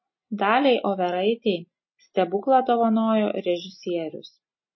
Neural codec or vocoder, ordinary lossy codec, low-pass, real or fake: none; MP3, 24 kbps; 7.2 kHz; real